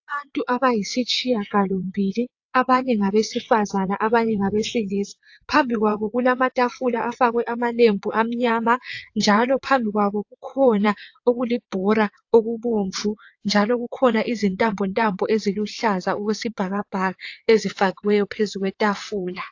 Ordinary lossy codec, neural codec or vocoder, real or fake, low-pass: AAC, 48 kbps; vocoder, 22.05 kHz, 80 mel bands, WaveNeXt; fake; 7.2 kHz